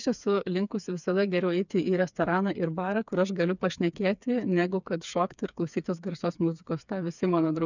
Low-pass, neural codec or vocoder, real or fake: 7.2 kHz; codec, 16 kHz, 4 kbps, FreqCodec, smaller model; fake